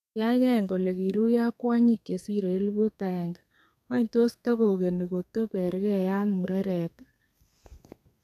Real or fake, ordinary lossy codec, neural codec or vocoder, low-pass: fake; none; codec, 32 kHz, 1.9 kbps, SNAC; 14.4 kHz